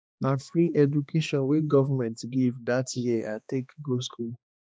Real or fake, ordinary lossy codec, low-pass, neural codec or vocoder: fake; none; none; codec, 16 kHz, 2 kbps, X-Codec, HuBERT features, trained on balanced general audio